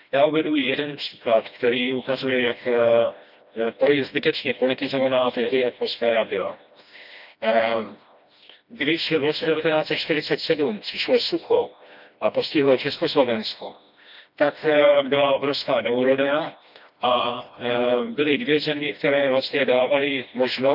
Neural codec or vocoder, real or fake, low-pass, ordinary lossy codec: codec, 16 kHz, 1 kbps, FreqCodec, smaller model; fake; 5.4 kHz; none